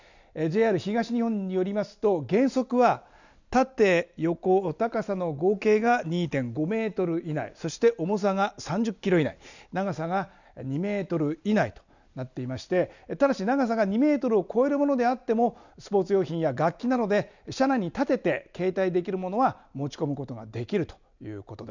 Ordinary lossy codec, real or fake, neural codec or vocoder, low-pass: none; real; none; 7.2 kHz